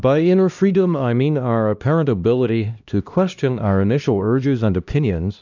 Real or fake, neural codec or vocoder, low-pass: fake; codec, 16 kHz, 1 kbps, X-Codec, HuBERT features, trained on LibriSpeech; 7.2 kHz